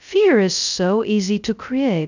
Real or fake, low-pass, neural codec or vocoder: fake; 7.2 kHz; codec, 16 kHz, 0.2 kbps, FocalCodec